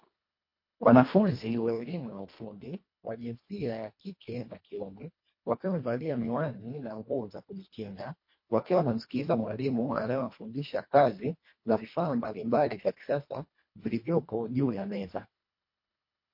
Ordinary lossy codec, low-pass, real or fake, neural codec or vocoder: MP3, 32 kbps; 5.4 kHz; fake; codec, 24 kHz, 1.5 kbps, HILCodec